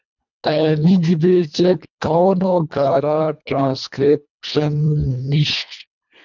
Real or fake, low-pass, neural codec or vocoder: fake; 7.2 kHz; codec, 24 kHz, 1.5 kbps, HILCodec